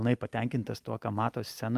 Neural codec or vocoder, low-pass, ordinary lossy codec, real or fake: none; 14.4 kHz; Opus, 32 kbps; real